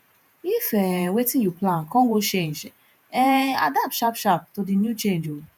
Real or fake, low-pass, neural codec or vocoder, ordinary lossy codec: fake; none; vocoder, 48 kHz, 128 mel bands, Vocos; none